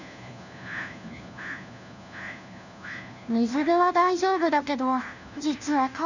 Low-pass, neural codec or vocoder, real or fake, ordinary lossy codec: 7.2 kHz; codec, 16 kHz, 1 kbps, FunCodec, trained on LibriTTS, 50 frames a second; fake; none